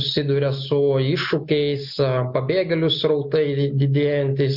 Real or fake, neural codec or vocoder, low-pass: real; none; 5.4 kHz